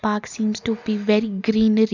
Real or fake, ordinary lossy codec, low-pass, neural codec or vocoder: real; none; 7.2 kHz; none